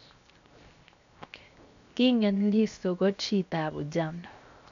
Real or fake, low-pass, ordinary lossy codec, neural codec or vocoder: fake; 7.2 kHz; none; codec, 16 kHz, 0.7 kbps, FocalCodec